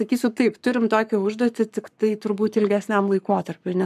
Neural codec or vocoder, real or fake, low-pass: codec, 44.1 kHz, 7.8 kbps, Pupu-Codec; fake; 14.4 kHz